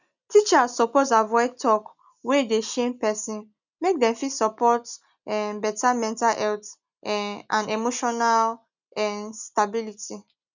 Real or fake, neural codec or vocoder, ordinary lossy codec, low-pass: real; none; none; 7.2 kHz